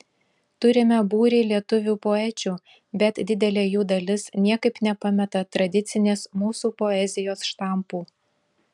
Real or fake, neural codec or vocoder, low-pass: real; none; 10.8 kHz